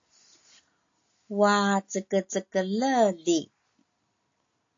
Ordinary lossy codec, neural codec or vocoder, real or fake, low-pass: AAC, 48 kbps; none; real; 7.2 kHz